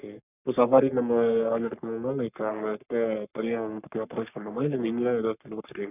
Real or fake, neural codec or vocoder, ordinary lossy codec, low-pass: fake; codec, 44.1 kHz, 3.4 kbps, Pupu-Codec; none; 3.6 kHz